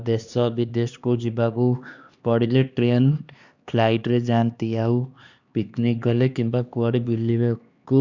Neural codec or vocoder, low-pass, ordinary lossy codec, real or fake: codec, 16 kHz, 2 kbps, X-Codec, HuBERT features, trained on LibriSpeech; 7.2 kHz; Opus, 64 kbps; fake